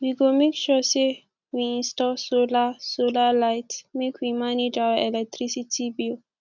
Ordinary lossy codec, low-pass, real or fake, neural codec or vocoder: none; 7.2 kHz; real; none